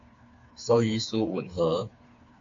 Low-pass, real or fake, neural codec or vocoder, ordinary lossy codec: 7.2 kHz; fake; codec, 16 kHz, 4 kbps, FreqCodec, smaller model; AAC, 48 kbps